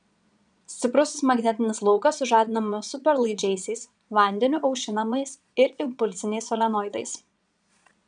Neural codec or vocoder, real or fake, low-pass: vocoder, 22.05 kHz, 80 mel bands, Vocos; fake; 9.9 kHz